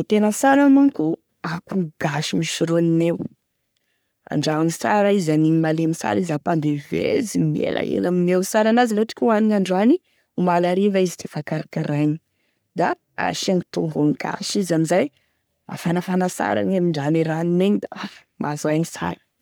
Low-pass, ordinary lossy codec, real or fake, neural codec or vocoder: none; none; fake; codec, 44.1 kHz, 3.4 kbps, Pupu-Codec